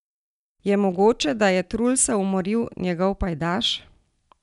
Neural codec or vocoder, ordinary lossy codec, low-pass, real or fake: none; none; 10.8 kHz; real